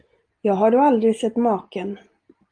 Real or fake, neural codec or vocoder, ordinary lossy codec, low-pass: real; none; Opus, 32 kbps; 9.9 kHz